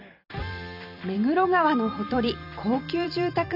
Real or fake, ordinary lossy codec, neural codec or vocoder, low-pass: fake; none; vocoder, 44.1 kHz, 128 mel bands every 256 samples, BigVGAN v2; 5.4 kHz